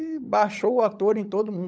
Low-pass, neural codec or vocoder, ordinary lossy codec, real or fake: none; codec, 16 kHz, 16 kbps, FunCodec, trained on LibriTTS, 50 frames a second; none; fake